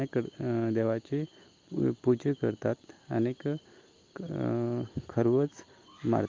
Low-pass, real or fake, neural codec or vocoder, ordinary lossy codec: 7.2 kHz; real; none; Opus, 24 kbps